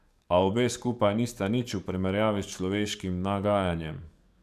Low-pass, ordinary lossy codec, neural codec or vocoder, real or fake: 14.4 kHz; none; codec, 44.1 kHz, 7.8 kbps, DAC; fake